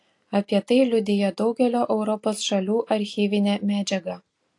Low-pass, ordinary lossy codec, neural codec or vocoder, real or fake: 10.8 kHz; AAC, 48 kbps; none; real